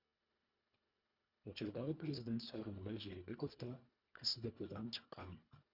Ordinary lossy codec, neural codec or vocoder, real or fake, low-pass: Opus, 64 kbps; codec, 24 kHz, 1.5 kbps, HILCodec; fake; 5.4 kHz